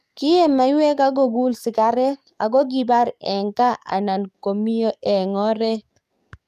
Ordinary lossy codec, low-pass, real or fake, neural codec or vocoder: none; 14.4 kHz; fake; codec, 44.1 kHz, 7.8 kbps, DAC